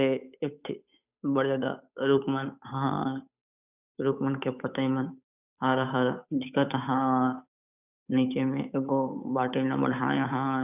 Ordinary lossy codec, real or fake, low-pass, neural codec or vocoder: none; fake; 3.6 kHz; codec, 16 kHz, 8 kbps, FunCodec, trained on Chinese and English, 25 frames a second